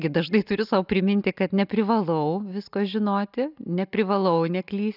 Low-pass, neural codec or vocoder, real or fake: 5.4 kHz; none; real